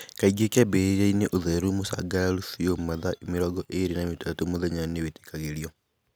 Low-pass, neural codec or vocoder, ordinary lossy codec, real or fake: none; none; none; real